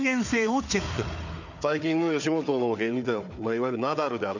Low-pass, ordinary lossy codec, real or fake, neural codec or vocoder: 7.2 kHz; none; fake; codec, 16 kHz, 4 kbps, FunCodec, trained on Chinese and English, 50 frames a second